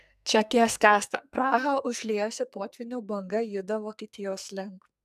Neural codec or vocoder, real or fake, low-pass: codec, 44.1 kHz, 2.6 kbps, SNAC; fake; 14.4 kHz